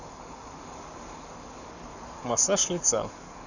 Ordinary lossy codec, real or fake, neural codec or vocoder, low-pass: none; fake; codec, 44.1 kHz, 7.8 kbps, Pupu-Codec; 7.2 kHz